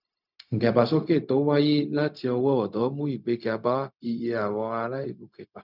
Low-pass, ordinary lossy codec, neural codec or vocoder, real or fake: 5.4 kHz; none; codec, 16 kHz, 0.4 kbps, LongCat-Audio-Codec; fake